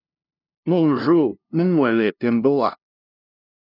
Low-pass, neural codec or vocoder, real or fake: 5.4 kHz; codec, 16 kHz, 0.5 kbps, FunCodec, trained on LibriTTS, 25 frames a second; fake